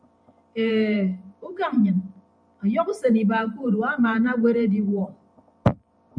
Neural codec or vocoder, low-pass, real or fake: vocoder, 44.1 kHz, 128 mel bands every 512 samples, BigVGAN v2; 9.9 kHz; fake